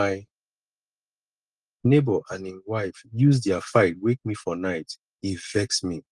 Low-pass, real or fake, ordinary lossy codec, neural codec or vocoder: 10.8 kHz; real; Opus, 24 kbps; none